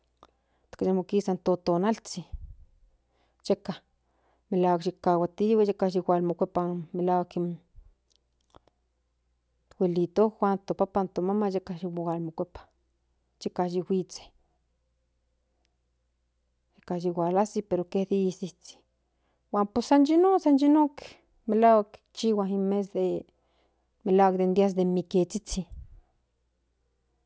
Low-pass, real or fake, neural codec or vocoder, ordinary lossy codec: none; real; none; none